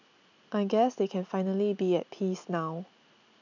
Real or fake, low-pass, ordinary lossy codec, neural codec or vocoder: real; 7.2 kHz; none; none